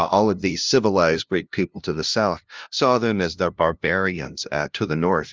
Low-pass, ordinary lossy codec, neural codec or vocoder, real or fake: 7.2 kHz; Opus, 32 kbps; codec, 16 kHz, 0.5 kbps, FunCodec, trained on LibriTTS, 25 frames a second; fake